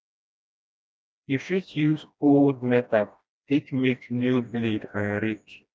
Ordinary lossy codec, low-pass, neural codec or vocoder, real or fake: none; none; codec, 16 kHz, 1 kbps, FreqCodec, smaller model; fake